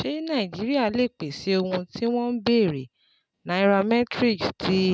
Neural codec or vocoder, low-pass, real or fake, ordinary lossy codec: none; none; real; none